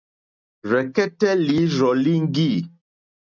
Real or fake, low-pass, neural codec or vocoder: real; 7.2 kHz; none